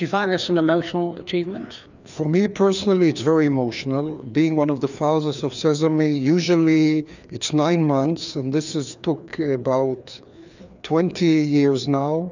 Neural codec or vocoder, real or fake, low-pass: codec, 16 kHz, 2 kbps, FreqCodec, larger model; fake; 7.2 kHz